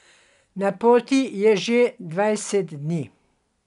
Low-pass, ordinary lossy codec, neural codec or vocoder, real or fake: 10.8 kHz; none; none; real